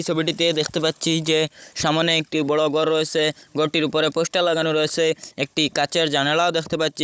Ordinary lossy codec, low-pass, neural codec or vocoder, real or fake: none; none; codec, 16 kHz, 16 kbps, FunCodec, trained on Chinese and English, 50 frames a second; fake